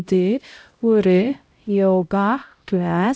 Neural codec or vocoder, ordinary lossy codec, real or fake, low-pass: codec, 16 kHz, 0.5 kbps, X-Codec, HuBERT features, trained on LibriSpeech; none; fake; none